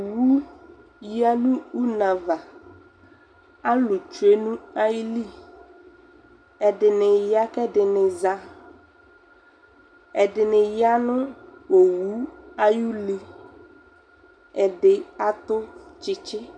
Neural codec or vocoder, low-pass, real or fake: none; 9.9 kHz; real